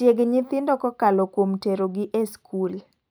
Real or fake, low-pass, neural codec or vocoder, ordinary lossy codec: real; none; none; none